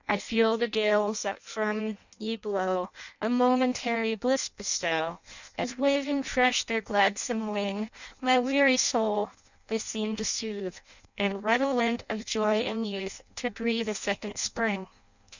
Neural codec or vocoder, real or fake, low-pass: codec, 16 kHz in and 24 kHz out, 0.6 kbps, FireRedTTS-2 codec; fake; 7.2 kHz